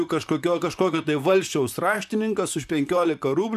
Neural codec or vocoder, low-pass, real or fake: vocoder, 44.1 kHz, 128 mel bands, Pupu-Vocoder; 14.4 kHz; fake